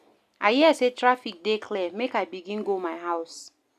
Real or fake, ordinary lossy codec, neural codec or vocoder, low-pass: real; none; none; 14.4 kHz